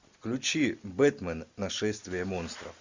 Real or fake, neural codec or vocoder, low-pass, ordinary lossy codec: real; none; 7.2 kHz; Opus, 64 kbps